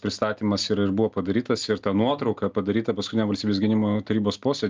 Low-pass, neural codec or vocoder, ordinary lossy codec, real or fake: 7.2 kHz; none; Opus, 24 kbps; real